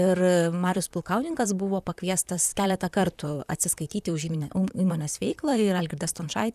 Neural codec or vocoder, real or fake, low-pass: vocoder, 44.1 kHz, 128 mel bands, Pupu-Vocoder; fake; 14.4 kHz